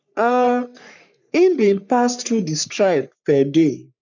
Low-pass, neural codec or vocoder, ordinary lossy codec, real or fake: 7.2 kHz; codec, 44.1 kHz, 3.4 kbps, Pupu-Codec; none; fake